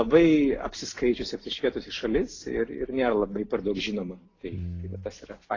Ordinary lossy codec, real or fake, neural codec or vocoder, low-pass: AAC, 32 kbps; real; none; 7.2 kHz